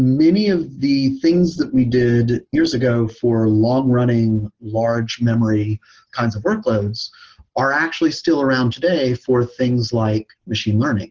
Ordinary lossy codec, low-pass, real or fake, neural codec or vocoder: Opus, 16 kbps; 7.2 kHz; real; none